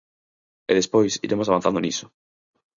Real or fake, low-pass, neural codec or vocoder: real; 7.2 kHz; none